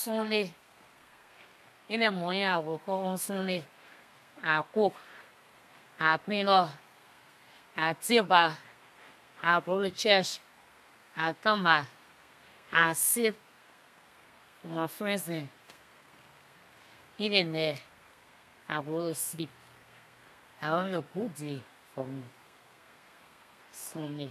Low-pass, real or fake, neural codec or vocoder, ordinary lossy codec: 14.4 kHz; fake; codec, 32 kHz, 1.9 kbps, SNAC; none